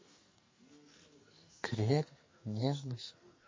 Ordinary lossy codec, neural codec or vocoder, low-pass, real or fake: MP3, 32 kbps; codec, 44.1 kHz, 2.6 kbps, SNAC; 7.2 kHz; fake